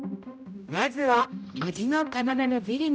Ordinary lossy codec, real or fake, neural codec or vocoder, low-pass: none; fake; codec, 16 kHz, 0.5 kbps, X-Codec, HuBERT features, trained on general audio; none